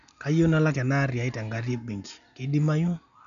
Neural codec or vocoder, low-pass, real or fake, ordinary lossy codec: none; 7.2 kHz; real; none